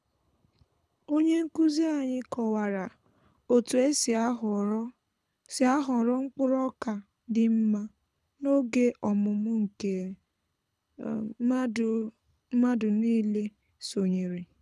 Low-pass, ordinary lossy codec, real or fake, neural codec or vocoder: none; none; fake; codec, 24 kHz, 6 kbps, HILCodec